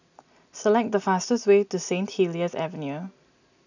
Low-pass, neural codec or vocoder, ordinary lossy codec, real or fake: 7.2 kHz; none; none; real